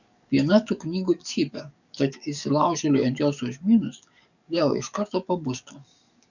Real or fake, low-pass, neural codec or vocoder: fake; 7.2 kHz; codec, 44.1 kHz, 7.8 kbps, DAC